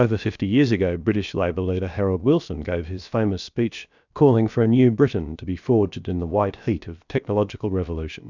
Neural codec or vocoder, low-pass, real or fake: codec, 16 kHz, about 1 kbps, DyCAST, with the encoder's durations; 7.2 kHz; fake